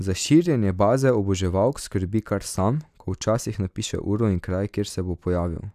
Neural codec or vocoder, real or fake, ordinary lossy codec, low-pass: none; real; none; 14.4 kHz